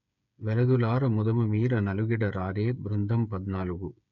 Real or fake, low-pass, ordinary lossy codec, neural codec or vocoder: fake; 7.2 kHz; none; codec, 16 kHz, 8 kbps, FreqCodec, smaller model